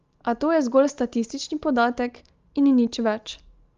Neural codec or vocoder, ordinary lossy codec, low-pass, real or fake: none; Opus, 32 kbps; 7.2 kHz; real